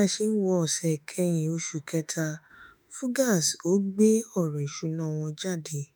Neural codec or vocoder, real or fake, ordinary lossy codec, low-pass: autoencoder, 48 kHz, 32 numbers a frame, DAC-VAE, trained on Japanese speech; fake; none; none